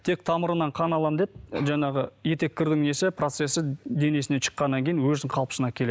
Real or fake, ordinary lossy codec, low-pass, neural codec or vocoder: real; none; none; none